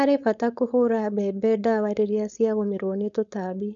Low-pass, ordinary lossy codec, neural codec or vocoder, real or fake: 7.2 kHz; none; codec, 16 kHz, 4.8 kbps, FACodec; fake